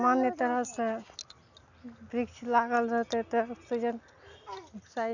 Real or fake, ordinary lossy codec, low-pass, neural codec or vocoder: real; none; 7.2 kHz; none